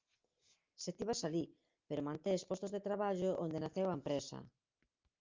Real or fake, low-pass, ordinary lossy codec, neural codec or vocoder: real; 7.2 kHz; Opus, 24 kbps; none